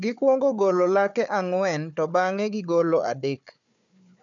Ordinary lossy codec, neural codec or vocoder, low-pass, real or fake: none; codec, 16 kHz, 4 kbps, FunCodec, trained on Chinese and English, 50 frames a second; 7.2 kHz; fake